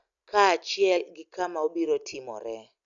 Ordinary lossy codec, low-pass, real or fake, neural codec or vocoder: none; 7.2 kHz; real; none